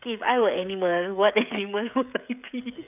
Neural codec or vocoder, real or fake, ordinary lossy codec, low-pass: codec, 16 kHz, 16 kbps, FreqCodec, smaller model; fake; none; 3.6 kHz